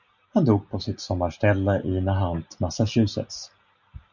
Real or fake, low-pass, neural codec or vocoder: real; 7.2 kHz; none